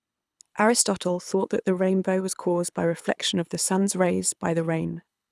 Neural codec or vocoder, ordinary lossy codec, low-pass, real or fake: codec, 24 kHz, 6 kbps, HILCodec; none; none; fake